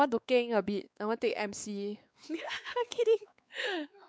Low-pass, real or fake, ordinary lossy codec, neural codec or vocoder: none; fake; none; codec, 16 kHz, 4 kbps, X-Codec, WavLM features, trained on Multilingual LibriSpeech